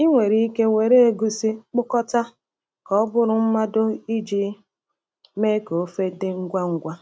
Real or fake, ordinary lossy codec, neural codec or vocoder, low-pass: real; none; none; none